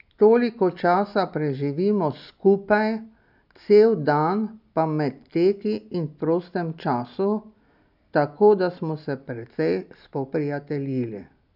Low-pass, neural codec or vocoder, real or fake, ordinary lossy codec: 5.4 kHz; none; real; none